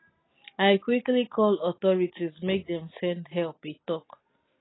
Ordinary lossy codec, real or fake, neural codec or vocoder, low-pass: AAC, 16 kbps; real; none; 7.2 kHz